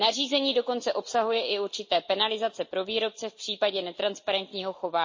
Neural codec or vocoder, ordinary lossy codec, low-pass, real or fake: none; MP3, 32 kbps; 7.2 kHz; real